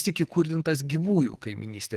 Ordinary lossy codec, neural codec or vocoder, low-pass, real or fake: Opus, 24 kbps; codec, 44.1 kHz, 2.6 kbps, SNAC; 14.4 kHz; fake